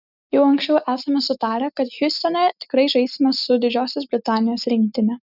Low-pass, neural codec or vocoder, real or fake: 5.4 kHz; none; real